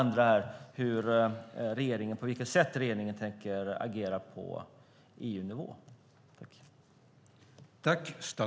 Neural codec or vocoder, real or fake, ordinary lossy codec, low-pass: none; real; none; none